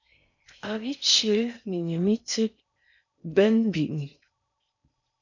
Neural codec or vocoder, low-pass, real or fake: codec, 16 kHz in and 24 kHz out, 0.6 kbps, FocalCodec, streaming, 4096 codes; 7.2 kHz; fake